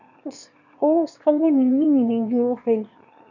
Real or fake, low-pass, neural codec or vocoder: fake; 7.2 kHz; autoencoder, 22.05 kHz, a latent of 192 numbers a frame, VITS, trained on one speaker